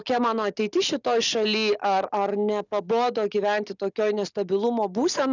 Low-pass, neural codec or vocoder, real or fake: 7.2 kHz; none; real